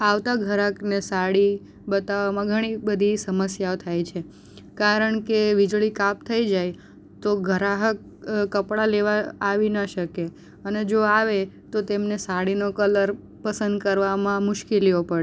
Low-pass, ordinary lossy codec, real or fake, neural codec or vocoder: none; none; real; none